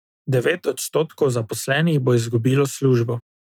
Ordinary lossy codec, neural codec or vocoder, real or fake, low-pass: none; none; real; 19.8 kHz